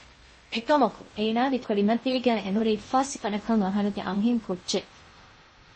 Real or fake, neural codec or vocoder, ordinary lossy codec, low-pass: fake; codec, 16 kHz in and 24 kHz out, 0.6 kbps, FocalCodec, streaming, 2048 codes; MP3, 32 kbps; 9.9 kHz